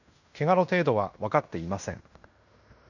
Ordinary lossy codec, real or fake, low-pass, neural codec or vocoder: none; fake; 7.2 kHz; codec, 16 kHz in and 24 kHz out, 0.9 kbps, LongCat-Audio-Codec, fine tuned four codebook decoder